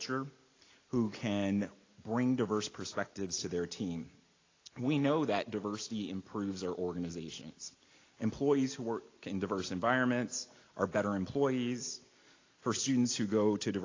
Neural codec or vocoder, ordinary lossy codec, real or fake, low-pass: none; AAC, 32 kbps; real; 7.2 kHz